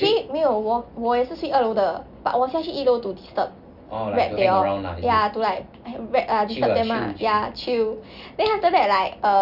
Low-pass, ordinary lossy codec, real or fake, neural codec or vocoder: 5.4 kHz; none; real; none